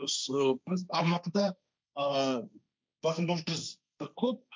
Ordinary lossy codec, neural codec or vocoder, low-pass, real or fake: none; codec, 16 kHz, 1.1 kbps, Voila-Tokenizer; none; fake